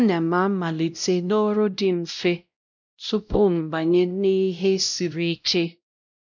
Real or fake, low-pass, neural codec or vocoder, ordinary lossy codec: fake; 7.2 kHz; codec, 16 kHz, 0.5 kbps, X-Codec, WavLM features, trained on Multilingual LibriSpeech; none